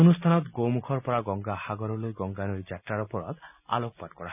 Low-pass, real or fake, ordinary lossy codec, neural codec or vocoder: 3.6 kHz; real; none; none